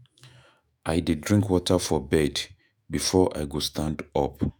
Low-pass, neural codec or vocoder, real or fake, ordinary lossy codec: none; autoencoder, 48 kHz, 128 numbers a frame, DAC-VAE, trained on Japanese speech; fake; none